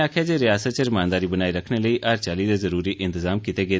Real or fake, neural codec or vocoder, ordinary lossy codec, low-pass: real; none; none; none